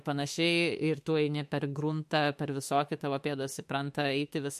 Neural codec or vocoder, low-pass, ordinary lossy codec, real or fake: autoencoder, 48 kHz, 32 numbers a frame, DAC-VAE, trained on Japanese speech; 14.4 kHz; MP3, 64 kbps; fake